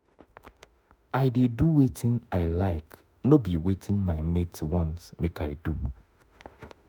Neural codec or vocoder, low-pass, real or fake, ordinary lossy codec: autoencoder, 48 kHz, 32 numbers a frame, DAC-VAE, trained on Japanese speech; none; fake; none